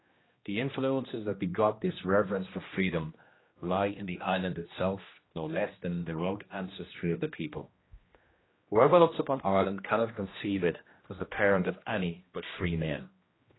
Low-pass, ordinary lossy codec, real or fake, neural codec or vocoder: 7.2 kHz; AAC, 16 kbps; fake; codec, 16 kHz, 1 kbps, X-Codec, HuBERT features, trained on general audio